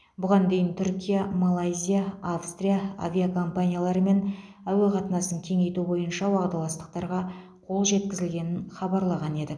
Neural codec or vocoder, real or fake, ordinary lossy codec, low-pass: none; real; none; none